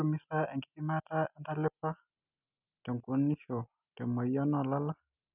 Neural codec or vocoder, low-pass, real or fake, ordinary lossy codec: none; 3.6 kHz; real; none